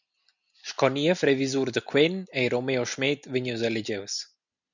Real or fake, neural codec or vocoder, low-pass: real; none; 7.2 kHz